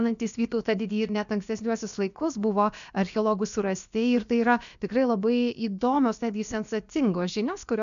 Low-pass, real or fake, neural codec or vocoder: 7.2 kHz; fake; codec, 16 kHz, about 1 kbps, DyCAST, with the encoder's durations